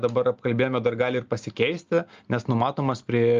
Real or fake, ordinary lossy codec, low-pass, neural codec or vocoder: real; Opus, 24 kbps; 7.2 kHz; none